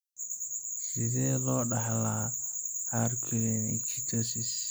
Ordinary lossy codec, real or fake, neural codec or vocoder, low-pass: none; real; none; none